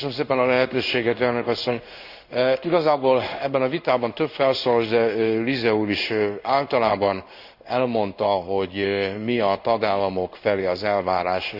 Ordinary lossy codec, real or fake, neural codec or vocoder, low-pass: Opus, 64 kbps; fake; codec, 16 kHz in and 24 kHz out, 1 kbps, XY-Tokenizer; 5.4 kHz